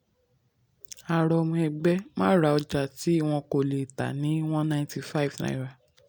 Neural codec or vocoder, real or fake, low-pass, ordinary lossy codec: none; real; none; none